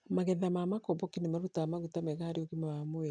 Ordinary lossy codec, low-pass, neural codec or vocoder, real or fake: none; 9.9 kHz; none; real